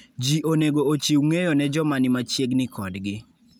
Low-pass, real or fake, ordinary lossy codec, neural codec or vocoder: none; real; none; none